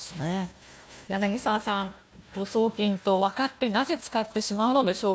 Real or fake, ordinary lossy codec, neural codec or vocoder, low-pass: fake; none; codec, 16 kHz, 1 kbps, FunCodec, trained on Chinese and English, 50 frames a second; none